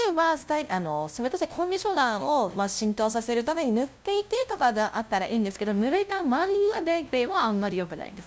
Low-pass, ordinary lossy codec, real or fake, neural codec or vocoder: none; none; fake; codec, 16 kHz, 0.5 kbps, FunCodec, trained on LibriTTS, 25 frames a second